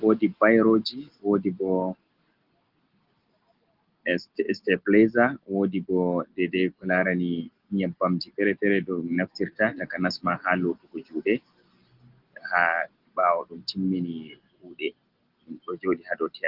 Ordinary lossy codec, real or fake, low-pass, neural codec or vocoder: Opus, 16 kbps; real; 5.4 kHz; none